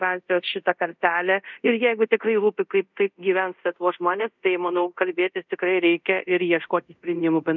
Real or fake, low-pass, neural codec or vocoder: fake; 7.2 kHz; codec, 24 kHz, 0.5 kbps, DualCodec